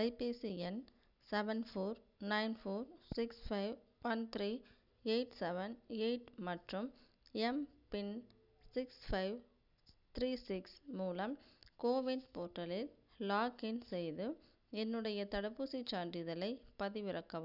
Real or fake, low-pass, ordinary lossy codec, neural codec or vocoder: real; 5.4 kHz; none; none